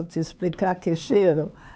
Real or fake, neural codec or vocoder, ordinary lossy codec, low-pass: fake; codec, 16 kHz, 4 kbps, X-Codec, HuBERT features, trained on LibriSpeech; none; none